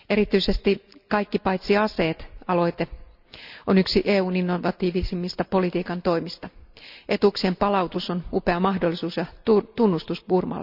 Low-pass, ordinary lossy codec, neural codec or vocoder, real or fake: 5.4 kHz; none; none; real